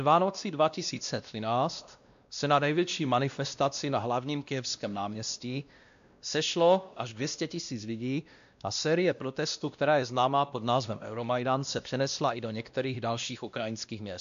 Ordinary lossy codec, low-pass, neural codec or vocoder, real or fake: AAC, 96 kbps; 7.2 kHz; codec, 16 kHz, 1 kbps, X-Codec, WavLM features, trained on Multilingual LibriSpeech; fake